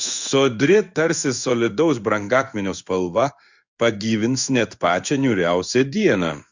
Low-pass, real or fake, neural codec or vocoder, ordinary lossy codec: 7.2 kHz; fake; codec, 16 kHz in and 24 kHz out, 1 kbps, XY-Tokenizer; Opus, 64 kbps